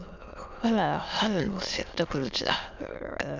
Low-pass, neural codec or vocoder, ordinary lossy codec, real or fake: 7.2 kHz; autoencoder, 22.05 kHz, a latent of 192 numbers a frame, VITS, trained on many speakers; none; fake